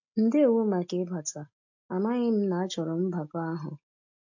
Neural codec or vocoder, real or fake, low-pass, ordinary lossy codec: none; real; 7.2 kHz; AAC, 48 kbps